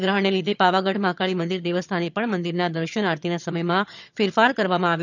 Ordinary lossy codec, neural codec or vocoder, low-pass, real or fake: none; vocoder, 22.05 kHz, 80 mel bands, HiFi-GAN; 7.2 kHz; fake